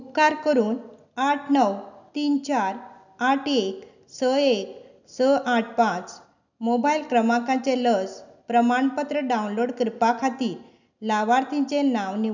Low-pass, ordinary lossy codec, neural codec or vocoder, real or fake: 7.2 kHz; none; none; real